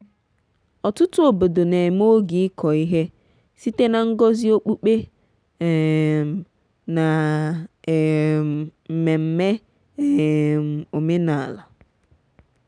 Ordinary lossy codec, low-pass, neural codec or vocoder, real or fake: none; 9.9 kHz; none; real